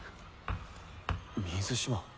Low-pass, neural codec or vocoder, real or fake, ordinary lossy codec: none; none; real; none